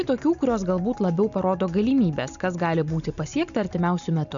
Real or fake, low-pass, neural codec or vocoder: real; 7.2 kHz; none